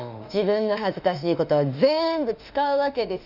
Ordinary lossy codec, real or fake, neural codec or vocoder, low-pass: none; fake; autoencoder, 48 kHz, 32 numbers a frame, DAC-VAE, trained on Japanese speech; 5.4 kHz